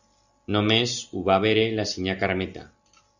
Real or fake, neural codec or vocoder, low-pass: real; none; 7.2 kHz